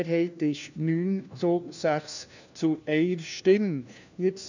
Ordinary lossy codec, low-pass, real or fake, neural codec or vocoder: none; 7.2 kHz; fake; codec, 16 kHz, 1 kbps, FunCodec, trained on LibriTTS, 50 frames a second